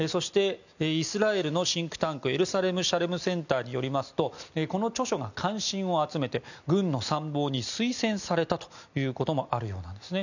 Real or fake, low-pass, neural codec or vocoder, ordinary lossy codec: real; 7.2 kHz; none; none